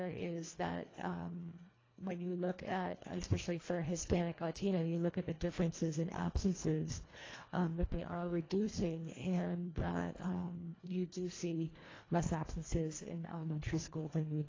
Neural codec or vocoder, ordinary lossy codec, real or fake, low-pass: codec, 24 kHz, 1.5 kbps, HILCodec; AAC, 32 kbps; fake; 7.2 kHz